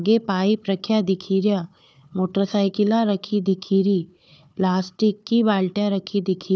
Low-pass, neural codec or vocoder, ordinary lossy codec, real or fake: none; codec, 16 kHz, 4 kbps, FunCodec, trained on Chinese and English, 50 frames a second; none; fake